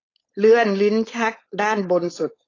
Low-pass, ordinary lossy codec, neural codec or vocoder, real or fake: 7.2 kHz; AAC, 32 kbps; codec, 16 kHz, 4.8 kbps, FACodec; fake